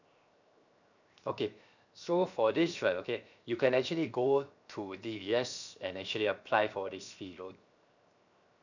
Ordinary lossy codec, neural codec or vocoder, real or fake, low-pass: none; codec, 16 kHz, 0.7 kbps, FocalCodec; fake; 7.2 kHz